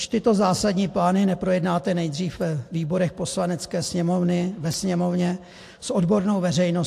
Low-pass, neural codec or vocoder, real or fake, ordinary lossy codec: 14.4 kHz; none; real; AAC, 64 kbps